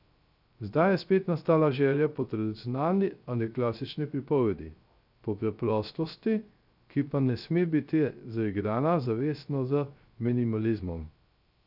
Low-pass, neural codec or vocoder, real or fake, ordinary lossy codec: 5.4 kHz; codec, 16 kHz, 0.3 kbps, FocalCodec; fake; none